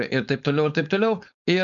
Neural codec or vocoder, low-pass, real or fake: codec, 16 kHz, 4.8 kbps, FACodec; 7.2 kHz; fake